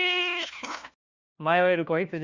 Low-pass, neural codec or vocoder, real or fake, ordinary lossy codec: 7.2 kHz; codec, 16 kHz, 1 kbps, FunCodec, trained on LibriTTS, 50 frames a second; fake; Opus, 64 kbps